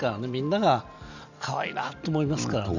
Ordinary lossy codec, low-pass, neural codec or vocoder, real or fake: none; 7.2 kHz; none; real